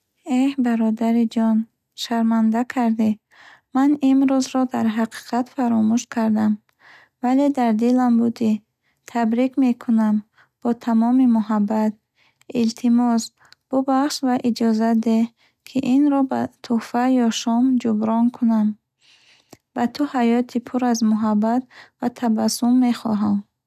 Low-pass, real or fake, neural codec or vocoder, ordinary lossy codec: 14.4 kHz; real; none; none